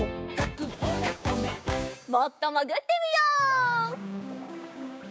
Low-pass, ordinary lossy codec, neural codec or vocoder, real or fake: none; none; codec, 16 kHz, 6 kbps, DAC; fake